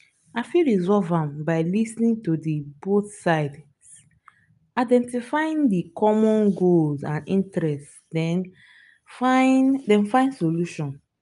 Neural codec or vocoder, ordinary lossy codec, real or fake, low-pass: none; none; real; 10.8 kHz